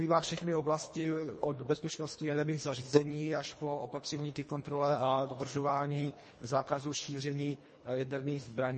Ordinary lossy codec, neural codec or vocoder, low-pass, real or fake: MP3, 32 kbps; codec, 24 kHz, 1.5 kbps, HILCodec; 10.8 kHz; fake